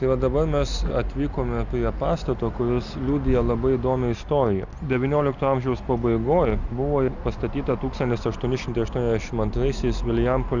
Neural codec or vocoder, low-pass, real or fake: none; 7.2 kHz; real